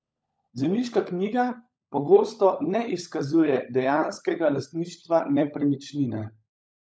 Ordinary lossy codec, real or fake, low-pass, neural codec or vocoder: none; fake; none; codec, 16 kHz, 16 kbps, FunCodec, trained on LibriTTS, 50 frames a second